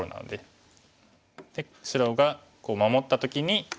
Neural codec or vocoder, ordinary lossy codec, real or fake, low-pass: none; none; real; none